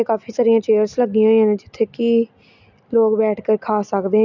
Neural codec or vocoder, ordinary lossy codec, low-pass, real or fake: none; none; 7.2 kHz; real